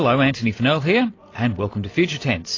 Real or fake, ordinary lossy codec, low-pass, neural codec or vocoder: real; AAC, 32 kbps; 7.2 kHz; none